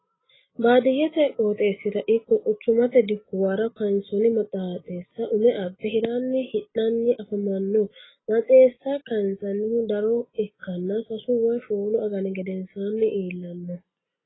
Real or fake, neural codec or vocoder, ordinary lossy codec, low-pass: real; none; AAC, 16 kbps; 7.2 kHz